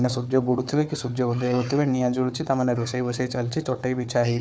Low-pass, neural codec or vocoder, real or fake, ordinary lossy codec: none; codec, 16 kHz, 4 kbps, FunCodec, trained on Chinese and English, 50 frames a second; fake; none